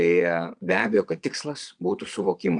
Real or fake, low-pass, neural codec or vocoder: fake; 9.9 kHz; vocoder, 22.05 kHz, 80 mel bands, Vocos